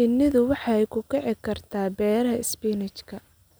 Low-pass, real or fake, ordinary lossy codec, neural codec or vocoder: none; real; none; none